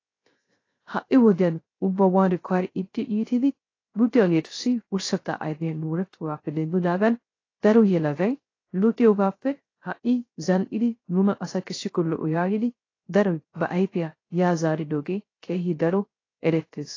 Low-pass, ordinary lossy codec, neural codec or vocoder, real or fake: 7.2 kHz; AAC, 32 kbps; codec, 16 kHz, 0.3 kbps, FocalCodec; fake